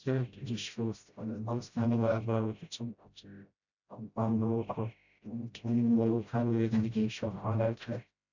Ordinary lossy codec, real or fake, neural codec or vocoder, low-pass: none; fake; codec, 16 kHz, 0.5 kbps, FreqCodec, smaller model; 7.2 kHz